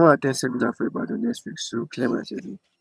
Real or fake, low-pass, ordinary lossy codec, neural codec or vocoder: fake; none; none; vocoder, 22.05 kHz, 80 mel bands, HiFi-GAN